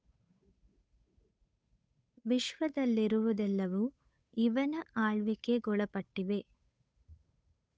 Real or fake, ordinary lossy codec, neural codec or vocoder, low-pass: fake; none; codec, 16 kHz, 8 kbps, FunCodec, trained on Chinese and English, 25 frames a second; none